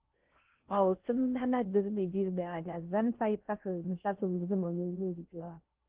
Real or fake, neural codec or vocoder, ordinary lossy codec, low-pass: fake; codec, 16 kHz in and 24 kHz out, 0.6 kbps, FocalCodec, streaming, 4096 codes; Opus, 16 kbps; 3.6 kHz